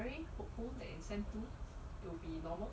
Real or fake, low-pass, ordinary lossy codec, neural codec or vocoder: real; none; none; none